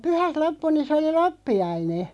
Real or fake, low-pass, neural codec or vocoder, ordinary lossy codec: real; none; none; none